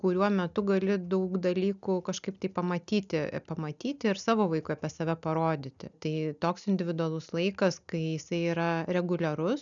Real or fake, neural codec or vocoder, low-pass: real; none; 7.2 kHz